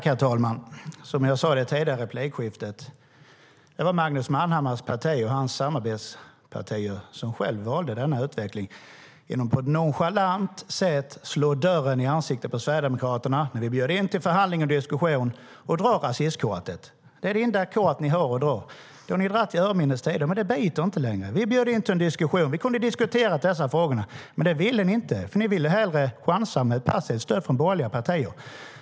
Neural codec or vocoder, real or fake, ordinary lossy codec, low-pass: none; real; none; none